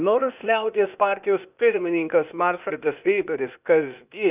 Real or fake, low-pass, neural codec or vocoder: fake; 3.6 kHz; codec, 16 kHz, 0.8 kbps, ZipCodec